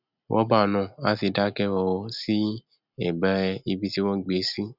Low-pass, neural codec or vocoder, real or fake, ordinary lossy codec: 5.4 kHz; none; real; none